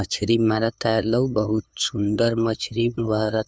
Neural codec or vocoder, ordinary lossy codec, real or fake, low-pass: codec, 16 kHz, 2 kbps, FunCodec, trained on LibriTTS, 25 frames a second; none; fake; none